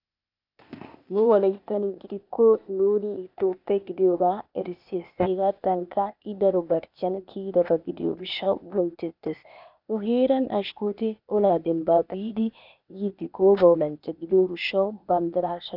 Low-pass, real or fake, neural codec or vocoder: 5.4 kHz; fake; codec, 16 kHz, 0.8 kbps, ZipCodec